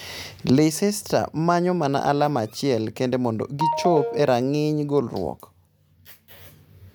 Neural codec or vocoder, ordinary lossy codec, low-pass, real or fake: none; none; none; real